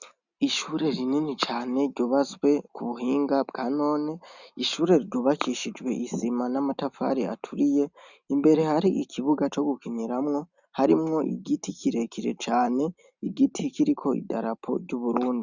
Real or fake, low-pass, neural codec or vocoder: real; 7.2 kHz; none